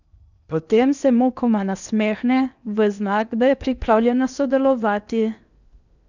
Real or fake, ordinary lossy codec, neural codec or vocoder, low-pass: fake; none; codec, 16 kHz in and 24 kHz out, 0.8 kbps, FocalCodec, streaming, 65536 codes; 7.2 kHz